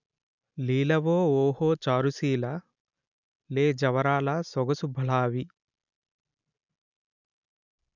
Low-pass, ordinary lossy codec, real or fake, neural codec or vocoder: 7.2 kHz; none; real; none